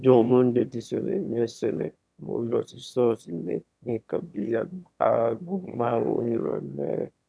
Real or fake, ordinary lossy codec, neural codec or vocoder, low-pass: fake; none; autoencoder, 22.05 kHz, a latent of 192 numbers a frame, VITS, trained on one speaker; none